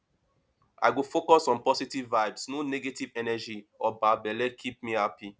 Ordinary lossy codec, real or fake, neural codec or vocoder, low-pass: none; real; none; none